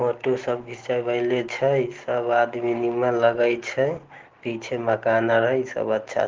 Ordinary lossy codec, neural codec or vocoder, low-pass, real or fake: Opus, 16 kbps; none; 7.2 kHz; real